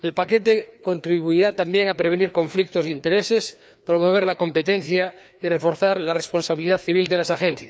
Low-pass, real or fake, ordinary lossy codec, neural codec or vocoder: none; fake; none; codec, 16 kHz, 2 kbps, FreqCodec, larger model